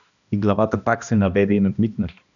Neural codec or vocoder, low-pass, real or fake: codec, 16 kHz, 1 kbps, X-Codec, HuBERT features, trained on balanced general audio; 7.2 kHz; fake